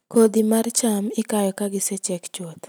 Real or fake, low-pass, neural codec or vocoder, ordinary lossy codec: real; none; none; none